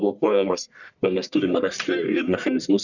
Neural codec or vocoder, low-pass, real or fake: codec, 44.1 kHz, 1.7 kbps, Pupu-Codec; 7.2 kHz; fake